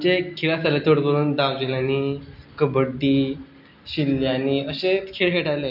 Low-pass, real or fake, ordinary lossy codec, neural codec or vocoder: 5.4 kHz; real; none; none